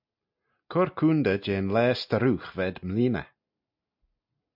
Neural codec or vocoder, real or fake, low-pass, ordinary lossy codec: none; real; 5.4 kHz; MP3, 48 kbps